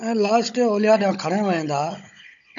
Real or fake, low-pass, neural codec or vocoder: fake; 7.2 kHz; codec, 16 kHz, 16 kbps, FunCodec, trained on Chinese and English, 50 frames a second